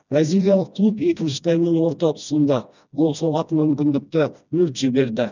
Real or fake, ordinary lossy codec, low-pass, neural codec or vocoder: fake; none; 7.2 kHz; codec, 16 kHz, 1 kbps, FreqCodec, smaller model